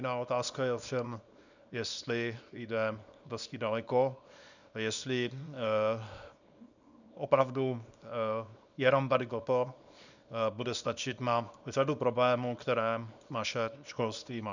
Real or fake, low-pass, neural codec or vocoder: fake; 7.2 kHz; codec, 24 kHz, 0.9 kbps, WavTokenizer, small release